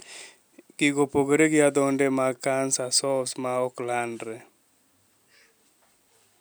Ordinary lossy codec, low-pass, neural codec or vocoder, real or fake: none; none; none; real